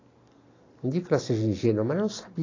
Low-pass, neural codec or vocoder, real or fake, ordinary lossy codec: 7.2 kHz; none; real; AAC, 32 kbps